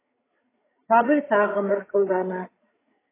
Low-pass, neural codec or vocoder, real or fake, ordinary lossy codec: 3.6 kHz; codec, 16 kHz, 8 kbps, FreqCodec, larger model; fake; AAC, 16 kbps